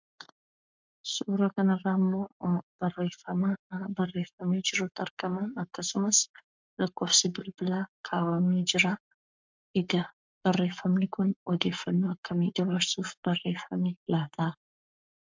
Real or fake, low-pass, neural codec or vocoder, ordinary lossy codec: fake; 7.2 kHz; codec, 44.1 kHz, 7.8 kbps, Pupu-Codec; MP3, 64 kbps